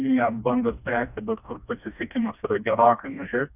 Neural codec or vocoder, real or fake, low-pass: codec, 16 kHz, 1 kbps, FreqCodec, smaller model; fake; 3.6 kHz